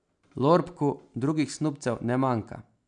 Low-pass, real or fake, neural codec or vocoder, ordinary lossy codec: 9.9 kHz; real; none; none